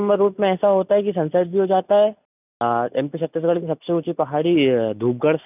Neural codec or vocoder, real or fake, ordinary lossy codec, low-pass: none; real; none; 3.6 kHz